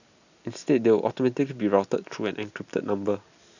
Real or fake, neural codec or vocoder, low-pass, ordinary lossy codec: real; none; 7.2 kHz; none